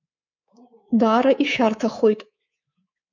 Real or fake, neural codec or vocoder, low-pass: fake; codec, 24 kHz, 3.1 kbps, DualCodec; 7.2 kHz